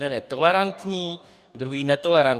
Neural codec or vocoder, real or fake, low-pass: codec, 44.1 kHz, 2.6 kbps, DAC; fake; 14.4 kHz